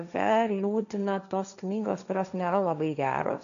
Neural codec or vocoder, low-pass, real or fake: codec, 16 kHz, 1.1 kbps, Voila-Tokenizer; 7.2 kHz; fake